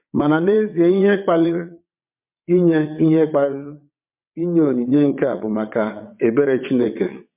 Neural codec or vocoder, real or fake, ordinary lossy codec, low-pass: vocoder, 22.05 kHz, 80 mel bands, WaveNeXt; fake; MP3, 32 kbps; 3.6 kHz